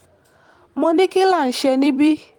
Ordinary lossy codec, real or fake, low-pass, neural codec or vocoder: Opus, 24 kbps; fake; 19.8 kHz; vocoder, 44.1 kHz, 128 mel bands every 256 samples, BigVGAN v2